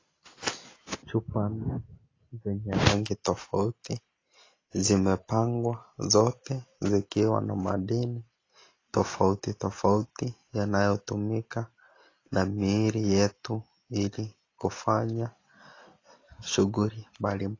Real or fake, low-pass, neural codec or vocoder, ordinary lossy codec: real; 7.2 kHz; none; AAC, 32 kbps